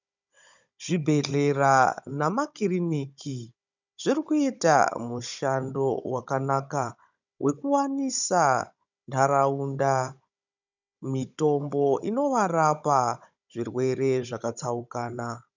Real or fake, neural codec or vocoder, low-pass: fake; codec, 16 kHz, 16 kbps, FunCodec, trained on Chinese and English, 50 frames a second; 7.2 kHz